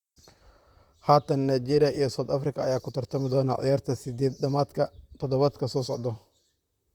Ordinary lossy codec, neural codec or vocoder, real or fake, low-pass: Opus, 64 kbps; vocoder, 44.1 kHz, 128 mel bands, Pupu-Vocoder; fake; 19.8 kHz